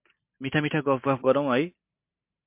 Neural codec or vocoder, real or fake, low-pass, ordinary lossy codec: none; real; 3.6 kHz; MP3, 32 kbps